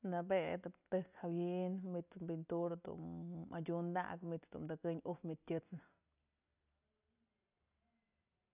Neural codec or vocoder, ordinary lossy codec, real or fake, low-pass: none; none; real; 3.6 kHz